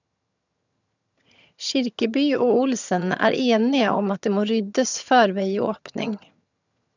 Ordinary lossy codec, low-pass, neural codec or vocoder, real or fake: none; 7.2 kHz; vocoder, 22.05 kHz, 80 mel bands, HiFi-GAN; fake